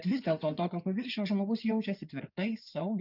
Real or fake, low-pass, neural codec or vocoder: fake; 5.4 kHz; codec, 16 kHz in and 24 kHz out, 2.2 kbps, FireRedTTS-2 codec